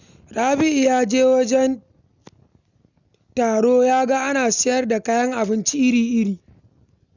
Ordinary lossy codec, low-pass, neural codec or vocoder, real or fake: none; 7.2 kHz; none; real